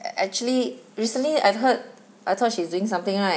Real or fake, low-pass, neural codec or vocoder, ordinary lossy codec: real; none; none; none